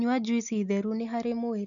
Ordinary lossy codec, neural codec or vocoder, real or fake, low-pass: none; none; real; 7.2 kHz